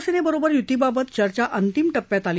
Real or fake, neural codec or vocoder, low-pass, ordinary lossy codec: real; none; none; none